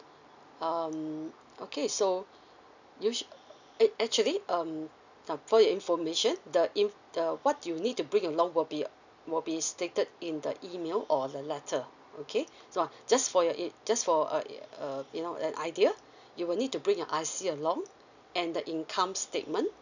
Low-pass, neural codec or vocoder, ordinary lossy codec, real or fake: 7.2 kHz; none; none; real